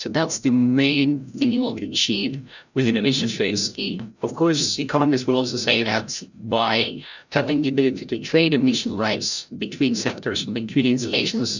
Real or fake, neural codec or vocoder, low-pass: fake; codec, 16 kHz, 0.5 kbps, FreqCodec, larger model; 7.2 kHz